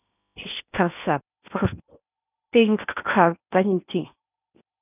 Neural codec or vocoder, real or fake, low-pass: codec, 16 kHz in and 24 kHz out, 0.8 kbps, FocalCodec, streaming, 65536 codes; fake; 3.6 kHz